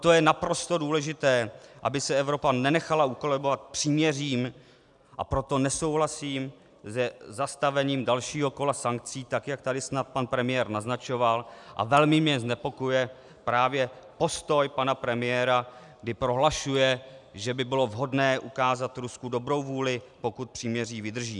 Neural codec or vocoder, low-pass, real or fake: none; 10.8 kHz; real